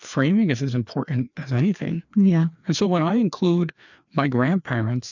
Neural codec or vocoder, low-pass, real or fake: codec, 16 kHz, 2 kbps, FreqCodec, larger model; 7.2 kHz; fake